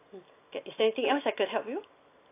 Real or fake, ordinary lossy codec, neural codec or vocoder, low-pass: real; AAC, 24 kbps; none; 3.6 kHz